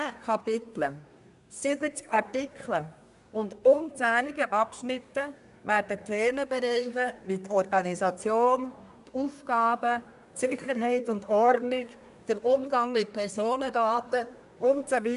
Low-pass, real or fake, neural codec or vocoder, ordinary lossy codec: 10.8 kHz; fake; codec, 24 kHz, 1 kbps, SNAC; none